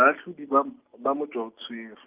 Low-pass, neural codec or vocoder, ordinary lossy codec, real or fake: 3.6 kHz; none; Opus, 32 kbps; real